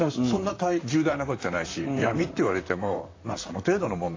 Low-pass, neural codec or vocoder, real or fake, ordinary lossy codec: 7.2 kHz; vocoder, 44.1 kHz, 128 mel bands, Pupu-Vocoder; fake; AAC, 32 kbps